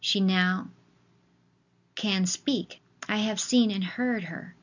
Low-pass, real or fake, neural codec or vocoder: 7.2 kHz; real; none